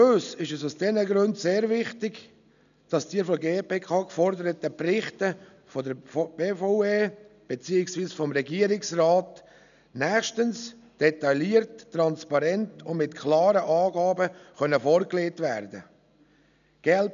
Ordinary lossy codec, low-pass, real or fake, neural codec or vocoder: none; 7.2 kHz; real; none